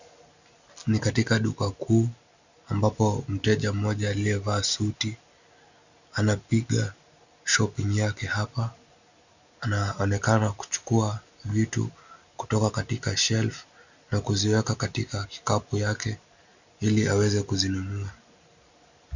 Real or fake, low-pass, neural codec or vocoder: real; 7.2 kHz; none